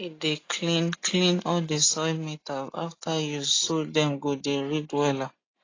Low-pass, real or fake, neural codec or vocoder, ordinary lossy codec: 7.2 kHz; real; none; AAC, 32 kbps